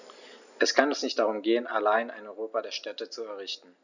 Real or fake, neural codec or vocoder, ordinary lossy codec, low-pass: real; none; none; 7.2 kHz